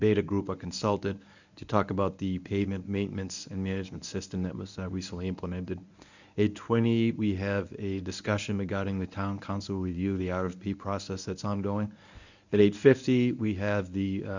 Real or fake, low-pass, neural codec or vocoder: fake; 7.2 kHz; codec, 24 kHz, 0.9 kbps, WavTokenizer, medium speech release version 1